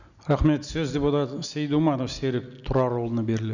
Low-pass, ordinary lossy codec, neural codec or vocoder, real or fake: 7.2 kHz; none; none; real